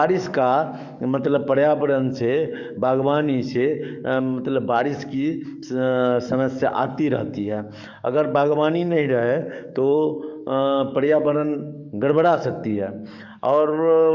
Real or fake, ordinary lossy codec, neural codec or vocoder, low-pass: fake; none; codec, 44.1 kHz, 7.8 kbps, DAC; 7.2 kHz